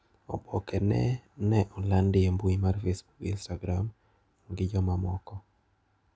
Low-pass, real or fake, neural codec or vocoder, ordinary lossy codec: none; real; none; none